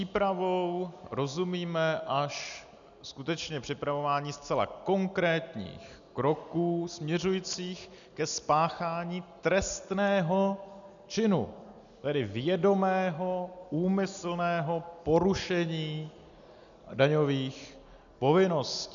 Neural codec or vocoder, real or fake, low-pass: none; real; 7.2 kHz